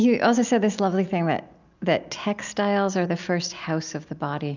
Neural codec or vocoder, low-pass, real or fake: none; 7.2 kHz; real